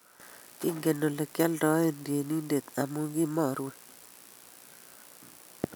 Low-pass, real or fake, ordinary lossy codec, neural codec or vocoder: none; real; none; none